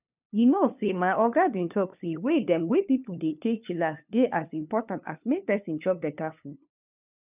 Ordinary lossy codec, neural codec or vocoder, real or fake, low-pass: none; codec, 16 kHz, 2 kbps, FunCodec, trained on LibriTTS, 25 frames a second; fake; 3.6 kHz